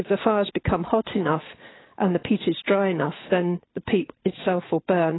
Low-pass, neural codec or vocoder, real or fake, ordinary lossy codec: 7.2 kHz; none; real; AAC, 16 kbps